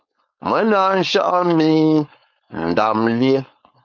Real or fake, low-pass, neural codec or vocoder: fake; 7.2 kHz; codec, 16 kHz, 4.8 kbps, FACodec